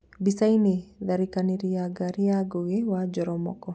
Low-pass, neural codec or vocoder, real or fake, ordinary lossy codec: none; none; real; none